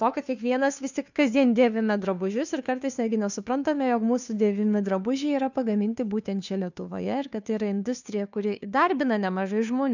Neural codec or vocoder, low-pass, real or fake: codec, 16 kHz, 2 kbps, FunCodec, trained on Chinese and English, 25 frames a second; 7.2 kHz; fake